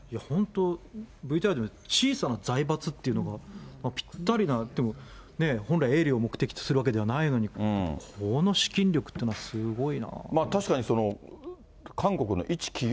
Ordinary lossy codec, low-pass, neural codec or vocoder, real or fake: none; none; none; real